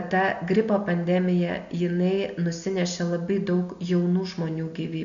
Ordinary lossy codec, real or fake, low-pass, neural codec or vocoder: MP3, 96 kbps; real; 7.2 kHz; none